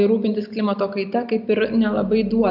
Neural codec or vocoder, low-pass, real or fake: none; 5.4 kHz; real